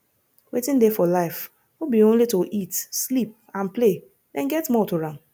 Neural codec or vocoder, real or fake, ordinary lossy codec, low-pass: none; real; none; none